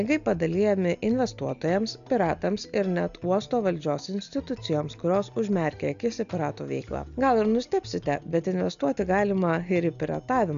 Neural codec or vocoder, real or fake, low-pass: none; real; 7.2 kHz